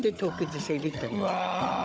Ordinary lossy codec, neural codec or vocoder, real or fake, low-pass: none; codec, 16 kHz, 16 kbps, FunCodec, trained on Chinese and English, 50 frames a second; fake; none